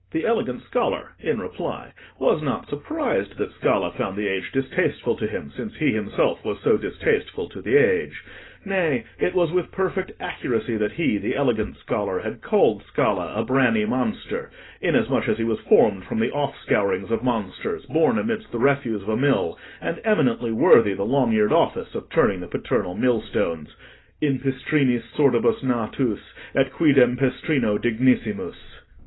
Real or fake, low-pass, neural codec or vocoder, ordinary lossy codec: real; 7.2 kHz; none; AAC, 16 kbps